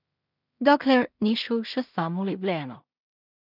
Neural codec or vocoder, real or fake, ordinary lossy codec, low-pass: codec, 16 kHz in and 24 kHz out, 0.4 kbps, LongCat-Audio-Codec, two codebook decoder; fake; none; 5.4 kHz